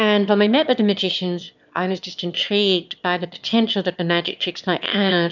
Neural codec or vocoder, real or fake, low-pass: autoencoder, 22.05 kHz, a latent of 192 numbers a frame, VITS, trained on one speaker; fake; 7.2 kHz